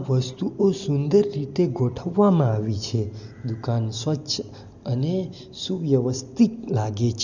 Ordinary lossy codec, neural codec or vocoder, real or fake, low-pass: none; none; real; 7.2 kHz